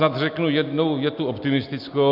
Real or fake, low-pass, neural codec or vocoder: real; 5.4 kHz; none